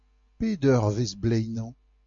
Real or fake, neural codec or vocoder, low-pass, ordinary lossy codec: real; none; 7.2 kHz; MP3, 48 kbps